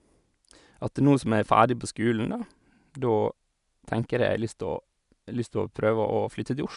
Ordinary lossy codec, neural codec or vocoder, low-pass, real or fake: none; none; 10.8 kHz; real